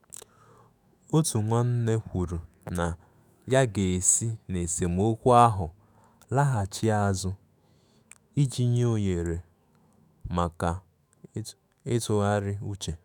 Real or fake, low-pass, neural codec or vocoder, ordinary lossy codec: fake; none; autoencoder, 48 kHz, 128 numbers a frame, DAC-VAE, trained on Japanese speech; none